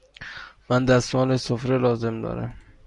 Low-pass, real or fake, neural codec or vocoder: 10.8 kHz; real; none